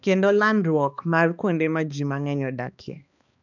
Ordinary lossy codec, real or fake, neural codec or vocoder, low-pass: none; fake; codec, 16 kHz, 2 kbps, X-Codec, HuBERT features, trained on balanced general audio; 7.2 kHz